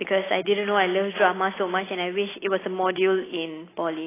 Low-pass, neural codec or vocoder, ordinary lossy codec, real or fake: 3.6 kHz; none; AAC, 16 kbps; real